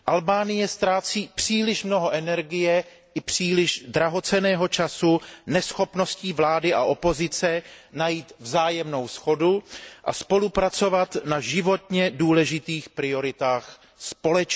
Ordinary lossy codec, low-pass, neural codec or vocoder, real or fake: none; none; none; real